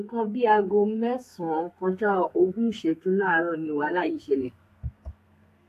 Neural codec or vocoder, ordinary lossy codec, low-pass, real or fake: codec, 32 kHz, 1.9 kbps, SNAC; AAC, 64 kbps; 14.4 kHz; fake